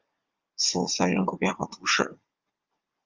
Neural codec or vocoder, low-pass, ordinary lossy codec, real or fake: vocoder, 44.1 kHz, 80 mel bands, Vocos; 7.2 kHz; Opus, 32 kbps; fake